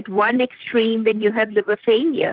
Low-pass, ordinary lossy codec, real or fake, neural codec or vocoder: 5.4 kHz; Opus, 32 kbps; fake; vocoder, 44.1 kHz, 128 mel bands, Pupu-Vocoder